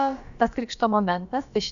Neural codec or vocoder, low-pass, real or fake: codec, 16 kHz, about 1 kbps, DyCAST, with the encoder's durations; 7.2 kHz; fake